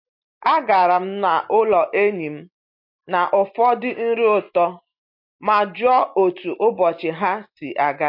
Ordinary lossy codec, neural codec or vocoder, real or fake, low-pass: MP3, 32 kbps; none; real; 5.4 kHz